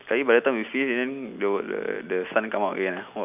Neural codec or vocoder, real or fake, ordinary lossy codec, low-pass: none; real; none; 3.6 kHz